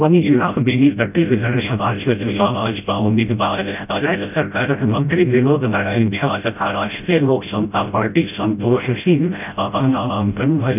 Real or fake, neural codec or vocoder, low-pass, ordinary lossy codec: fake; codec, 16 kHz, 0.5 kbps, FreqCodec, smaller model; 3.6 kHz; none